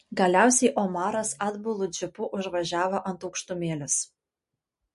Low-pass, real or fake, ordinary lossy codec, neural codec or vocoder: 14.4 kHz; real; MP3, 48 kbps; none